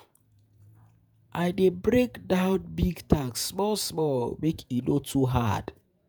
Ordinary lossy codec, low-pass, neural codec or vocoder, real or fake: none; none; vocoder, 48 kHz, 128 mel bands, Vocos; fake